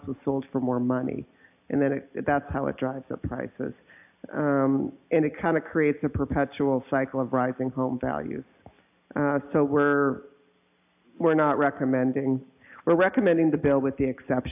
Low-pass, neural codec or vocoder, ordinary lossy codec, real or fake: 3.6 kHz; none; AAC, 32 kbps; real